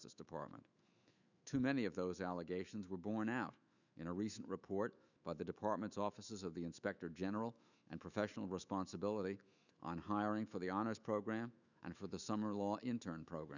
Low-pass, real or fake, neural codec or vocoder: 7.2 kHz; fake; autoencoder, 48 kHz, 128 numbers a frame, DAC-VAE, trained on Japanese speech